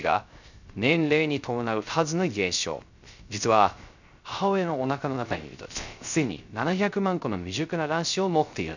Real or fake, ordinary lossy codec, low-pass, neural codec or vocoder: fake; none; 7.2 kHz; codec, 16 kHz, 0.3 kbps, FocalCodec